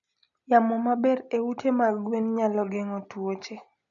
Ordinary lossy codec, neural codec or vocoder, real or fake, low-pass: none; none; real; 7.2 kHz